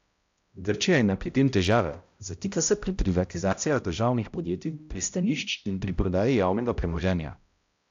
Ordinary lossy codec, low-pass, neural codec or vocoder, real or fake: AAC, 64 kbps; 7.2 kHz; codec, 16 kHz, 0.5 kbps, X-Codec, HuBERT features, trained on balanced general audio; fake